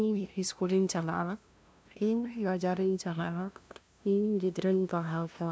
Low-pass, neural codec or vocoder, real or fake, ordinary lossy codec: none; codec, 16 kHz, 0.5 kbps, FunCodec, trained on LibriTTS, 25 frames a second; fake; none